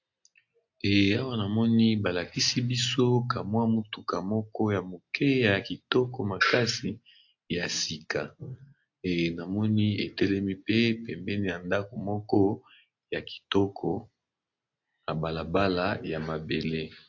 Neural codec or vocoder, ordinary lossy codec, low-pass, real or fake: none; AAC, 48 kbps; 7.2 kHz; real